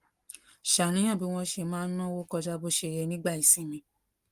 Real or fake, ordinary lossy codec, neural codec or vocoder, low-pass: real; Opus, 24 kbps; none; 14.4 kHz